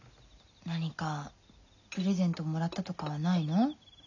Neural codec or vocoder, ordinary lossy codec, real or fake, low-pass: none; none; real; 7.2 kHz